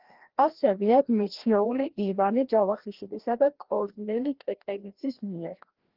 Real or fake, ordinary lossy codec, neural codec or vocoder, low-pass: fake; Opus, 16 kbps; codec, 16 kHz, 1 kbps, FreqCodec, larger model; 5.4 kHz